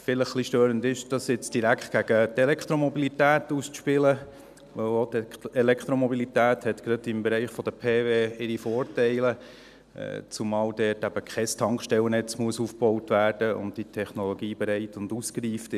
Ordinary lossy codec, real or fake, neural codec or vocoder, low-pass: none; real; none; 14.4 kHz